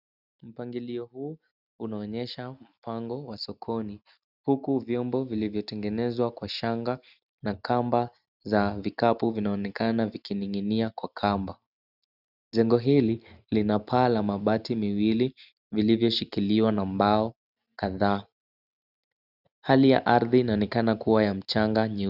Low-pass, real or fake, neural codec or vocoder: 5.4 kHz; real; none